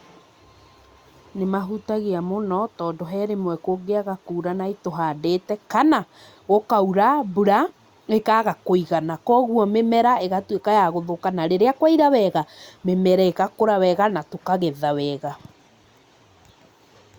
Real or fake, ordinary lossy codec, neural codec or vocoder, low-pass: real; Opus, 64 kbps; none; 19.8 kHz